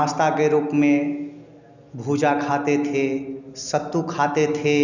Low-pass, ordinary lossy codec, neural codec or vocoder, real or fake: 7.2 kHz; none; none; real